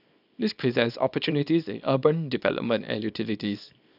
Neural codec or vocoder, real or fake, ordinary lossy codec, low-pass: codec, 24 kHz, 0.9 kbps, WavTokenizer, small release; fake; none; 5.4 kHz